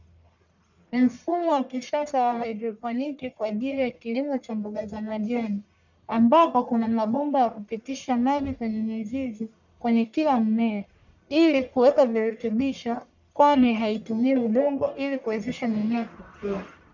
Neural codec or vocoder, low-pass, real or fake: codec, 44.1 kHz, 1.7 kbps, Pupu-Codec; 7.2 kHz; fake